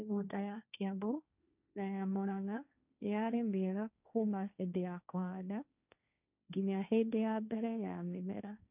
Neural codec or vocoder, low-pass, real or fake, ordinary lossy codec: codec, 16 kHz, 1.1 kbps, Voila-Tokenizer; 3.6 kHz; fake; none